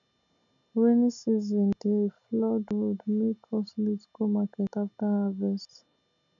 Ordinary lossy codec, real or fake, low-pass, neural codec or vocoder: none; real; 7.2 kHz; none